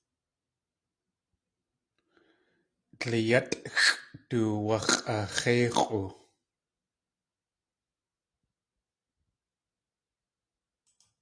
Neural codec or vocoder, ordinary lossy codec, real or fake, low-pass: none; AAC, 48 kbps; real; 9.9 kHz